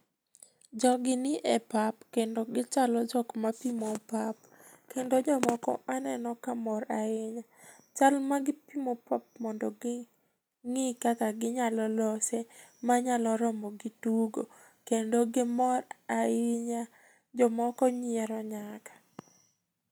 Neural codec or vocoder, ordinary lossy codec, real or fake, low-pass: none; none; real; none